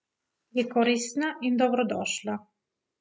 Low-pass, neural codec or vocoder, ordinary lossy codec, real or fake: none; none; none; real